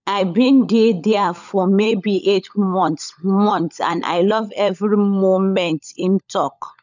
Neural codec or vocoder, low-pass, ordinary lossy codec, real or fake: codec, 16 kHz, 16 kbps, FunCodec, trained on LibriTTS, 50 frames a second; 7.2 kHz; none; fake